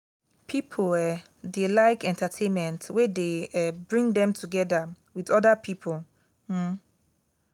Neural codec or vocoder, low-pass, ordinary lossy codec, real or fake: none; 19.8 kHz; none; real